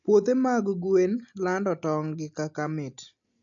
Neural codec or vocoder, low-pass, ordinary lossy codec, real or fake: none; 7.2 kHz; AAC, 64 kbps; real